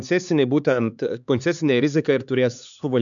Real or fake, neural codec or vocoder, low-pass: fake; codec, 16 kHz, 4 kbps, X-Codec, HuBERT features, trained on LibriSpeech; 7.2 kHz